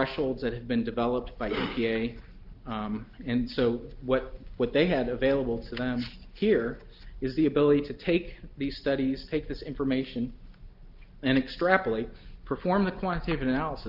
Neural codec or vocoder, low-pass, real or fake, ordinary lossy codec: none; 5.4 kHz; real; Opus, 32 kbps